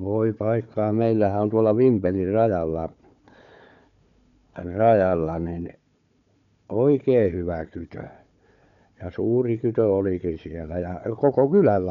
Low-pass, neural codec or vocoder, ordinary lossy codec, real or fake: 7.2 kHz; codec, 16 kHz, 4 kbps, FunCodec, trained on Chinese and English, 50 frames a second; none; fake